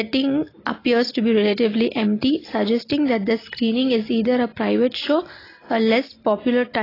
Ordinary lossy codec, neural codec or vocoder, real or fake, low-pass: AAC, 24 kbps; none; real; 5.4 kHz